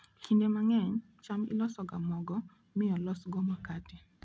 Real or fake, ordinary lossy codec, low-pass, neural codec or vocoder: real; none; none; none